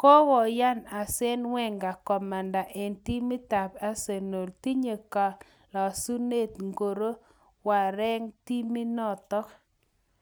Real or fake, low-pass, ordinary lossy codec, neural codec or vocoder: real; none; none; none